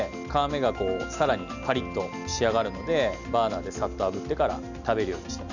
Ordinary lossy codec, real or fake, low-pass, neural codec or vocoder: none; real; 7.2 kHz; none